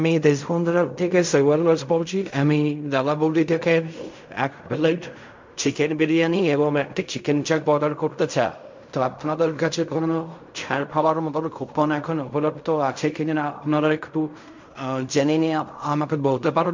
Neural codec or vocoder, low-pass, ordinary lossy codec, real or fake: codec, 16 kHz in and 24 kHz out, 0.4 kbps, LongCat-Audio-Codec, fine tuned four codebook decoder; 7.2 kHz; MP3, 64 kbps; fake